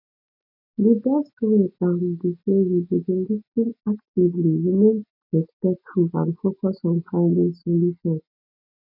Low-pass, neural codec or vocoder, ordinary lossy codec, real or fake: 5.4 kHz; none; none; real